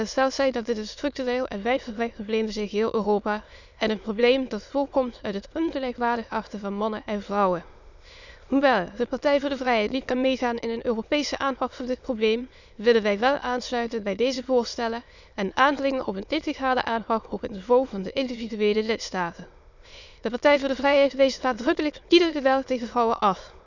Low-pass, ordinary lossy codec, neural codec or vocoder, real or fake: 7.2 kHz; none; autoencoder, 22.05 kHz, a latent of 192 numbers a frame, VITS, trained on many speakers; fake